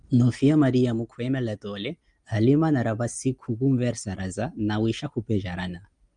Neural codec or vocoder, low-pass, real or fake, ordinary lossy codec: none; 9.9 kHz; real; Opus, 32 kbps